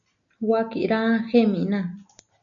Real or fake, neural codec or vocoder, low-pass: real; none; 7.2 kHz